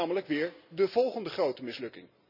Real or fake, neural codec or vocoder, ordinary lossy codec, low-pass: real; none; none; 5.4 kHz